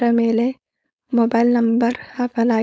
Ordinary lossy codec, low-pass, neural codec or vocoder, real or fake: none; none; codec, 16 kHz, 4.8 kbps, FACodec; fake